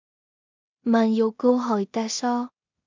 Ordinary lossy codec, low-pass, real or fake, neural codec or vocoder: MP3, 64 kbps; 7.2 kHz; fake; codec, 16 kHz in and 24 kHz out, 0.4 kbps, LongCat-Audio-Codec, two codebook decoder